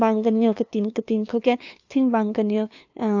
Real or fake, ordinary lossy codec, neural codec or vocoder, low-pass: fake; AAC, 48 kbps; codec, 16 kHz, 2 kbps, FunCodec, trained on LibriTTS, 25 frames a second; 7.2 kHz